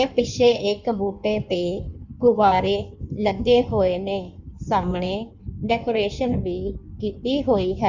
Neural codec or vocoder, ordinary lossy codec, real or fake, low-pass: codec, 16 kHz in and 24 kHz out, 1.1 kbps, FireRedTTS-2 codec; none; fake; 7.2 kHz